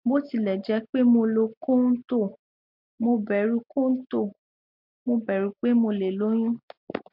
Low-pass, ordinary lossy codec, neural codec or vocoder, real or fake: 5.4 kHz; MP3, 48 kbps; none; real